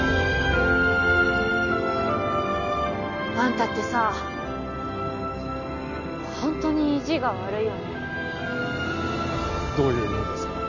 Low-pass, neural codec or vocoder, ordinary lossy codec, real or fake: 7.2 kHz; none; none; real